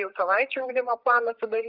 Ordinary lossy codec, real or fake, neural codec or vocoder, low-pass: Opus, 32 kbps; fake; codec, 16 kHz, 8 kbps, FreqCodec, larger model; 5.4 kHz